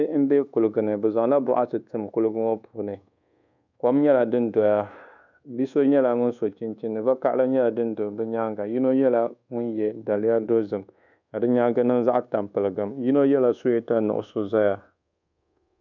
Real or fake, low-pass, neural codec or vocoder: fake; 7.2 kHz; codec, 24 kHz, 1.2 kbps, DualCodec